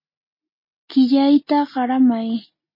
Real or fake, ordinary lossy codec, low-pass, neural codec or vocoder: real; MP3, 24 kbps; 5.4 kHz; none